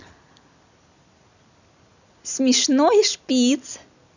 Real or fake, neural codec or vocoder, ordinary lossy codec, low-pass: real; none; none; 7.2 kHz